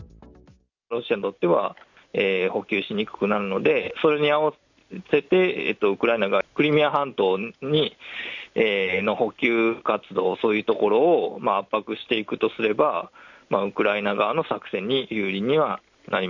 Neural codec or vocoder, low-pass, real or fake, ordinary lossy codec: none; 7.2 kHz; real; none